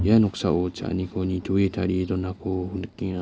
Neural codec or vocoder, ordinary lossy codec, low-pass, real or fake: none; none; none; real